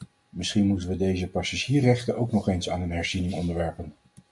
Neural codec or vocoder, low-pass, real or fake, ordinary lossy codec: none; 10.8 kHz; real; MP3, 64 kbps